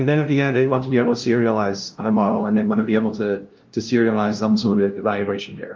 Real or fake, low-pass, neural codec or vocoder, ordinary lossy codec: fake; 7.2 kHz; codec, 16 kHz, 0.5 kbps, FunCodec, trained on LibriTTS, 25 frames a second; Opus, 32 kbps